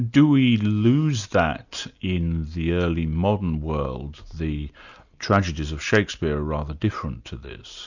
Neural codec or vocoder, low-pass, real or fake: none; 7.2 kHz; real